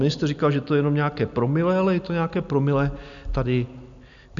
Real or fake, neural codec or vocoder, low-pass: real; none; 7.2 kHz